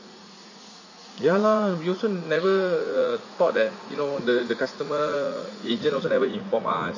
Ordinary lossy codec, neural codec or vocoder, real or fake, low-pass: MP3, 32 kbps; vocoder, 44.1 kHz, 80 mel bands, Vocos; fake; 7.2 kHz